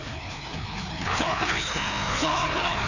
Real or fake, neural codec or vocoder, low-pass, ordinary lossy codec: fake; codec, 16 kHz, 2 kbps, FreqCodec, larger model; 7.2 kHz; none